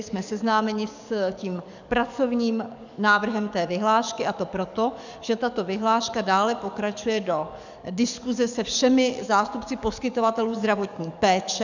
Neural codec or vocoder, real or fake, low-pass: codec, 44.1 kHz, 7.8 kbps, DAC; fake; 7.2 kHz